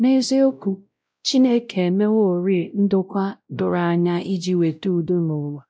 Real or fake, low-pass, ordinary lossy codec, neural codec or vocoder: fake; none; none; codec, 16 kHz, 0.5 kbps, X-Codec, WavLM features, trained on Multilingual LibriSpeech